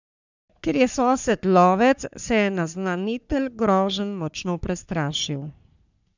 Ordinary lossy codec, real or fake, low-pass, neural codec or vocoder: none; fake; 7.2 kHz; codec, 44.1 kHz, 3.4 kbps, Pupu-Codec